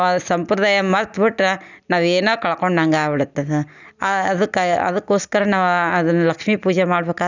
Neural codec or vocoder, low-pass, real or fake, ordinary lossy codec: none; 7.2 kHz; real; none